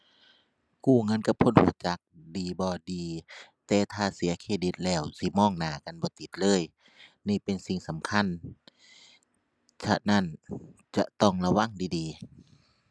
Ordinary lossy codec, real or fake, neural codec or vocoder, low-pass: none; real; none; none